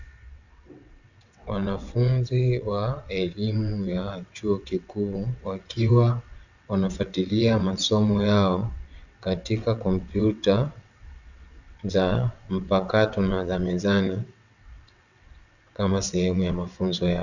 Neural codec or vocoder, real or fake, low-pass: vocoder, 22.05 kHz, 80 mel bands, WaveNeXt; fake; 7.2 kHz